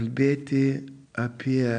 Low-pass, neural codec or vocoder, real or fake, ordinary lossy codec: 9.9 kHz; none; real; MP3, 96 kbps